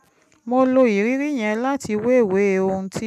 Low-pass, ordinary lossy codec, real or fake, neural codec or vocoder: 14.4 kHz; none; real; none